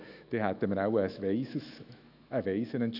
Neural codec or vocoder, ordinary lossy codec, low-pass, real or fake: autoencoder, 48 kHz, 128 numbers a frame, DAC-VAE, trained on Japanese speech; none; 5.4 kHz; fake